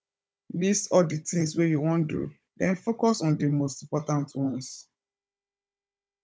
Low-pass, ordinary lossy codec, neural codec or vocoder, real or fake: none; none; codec, 16 kHz, 16 kbps, FunCodec, trained on Chinese and English, 50 frames a second; fake